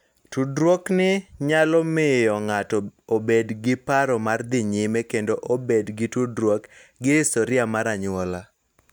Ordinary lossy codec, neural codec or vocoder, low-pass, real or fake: none; none; none; real